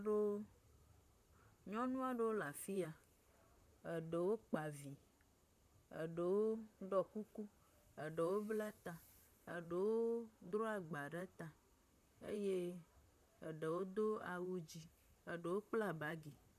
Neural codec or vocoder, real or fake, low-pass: vocoder, 44.1 kHz, 128 mel bands, Pupu-Vocoder; fake; 14.4 kHz